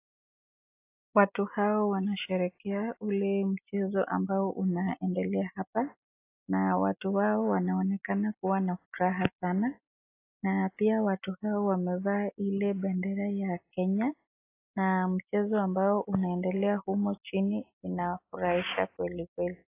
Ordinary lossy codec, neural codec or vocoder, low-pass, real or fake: AAC, 24 kbps; none; 3.6 kHz; real